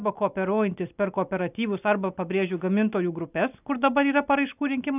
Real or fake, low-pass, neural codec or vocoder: real; 3.6 kHz; none